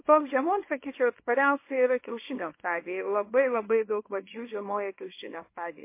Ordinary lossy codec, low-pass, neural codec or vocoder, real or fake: MP3, 24 kbps; 3.6 kHz; codec, 24 kHz, 0.9 kbps, WavTokenizer, small release; fake